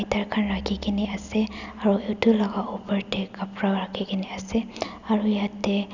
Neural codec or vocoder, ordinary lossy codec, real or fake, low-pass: none; none; real; 7.2 kHz